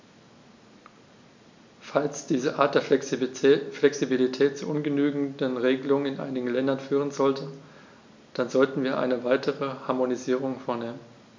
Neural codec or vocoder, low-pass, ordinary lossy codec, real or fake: none; 7.2 kHz; MP3, 64 kbps; real